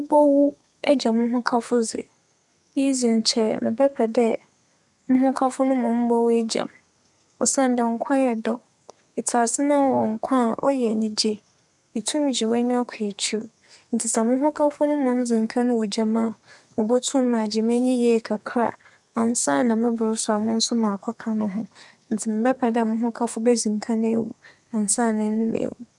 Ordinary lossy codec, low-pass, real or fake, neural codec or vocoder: none; 10.8 kHz; fake; codec, 32 kHz, 1.9 kbps, SNAC